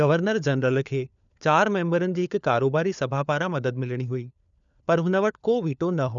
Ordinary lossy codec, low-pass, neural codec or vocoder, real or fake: none; 7.2 kHz; codec, 16 kHz, 4 kbps, FunCodec, trained on LibriTTS, 50 frames a second; fake